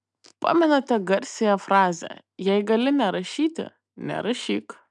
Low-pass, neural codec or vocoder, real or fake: 10.8 kHz; none; real